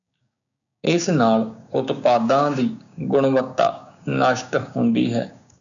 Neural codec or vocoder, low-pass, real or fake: codec, 16 kHz, 6 kbps, DAC; 7.2 kHz; fake